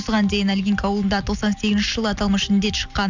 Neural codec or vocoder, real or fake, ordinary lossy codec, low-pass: none; real; none; 7.2 kHz